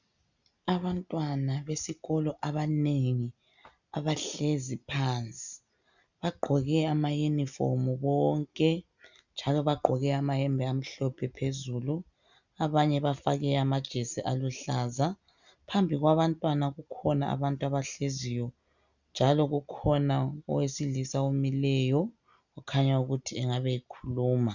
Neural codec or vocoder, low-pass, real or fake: none; 7.2 kHz; real